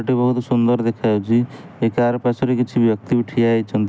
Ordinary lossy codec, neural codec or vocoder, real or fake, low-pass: none; none; real; none